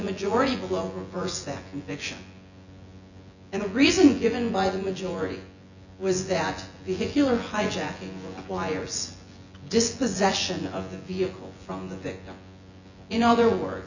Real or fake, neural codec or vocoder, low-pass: fake; vocoder, 24 kHz, 100 mel bands, Vocos; 7.2 kHz